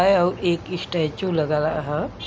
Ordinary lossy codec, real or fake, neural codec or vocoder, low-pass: none; real; none; none